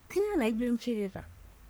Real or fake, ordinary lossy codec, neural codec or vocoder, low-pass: fake; none; codec, 44.1 kHz, 1.7 kbps, Pupu-Codec; none